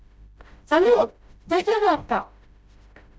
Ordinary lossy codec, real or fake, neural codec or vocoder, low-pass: none; fake; codec, 16 kHz, 0.5 kbps, FreqCodec, smaller model; none